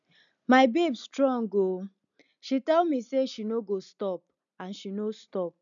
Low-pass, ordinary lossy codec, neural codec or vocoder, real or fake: 7.2 kHz; none; none; real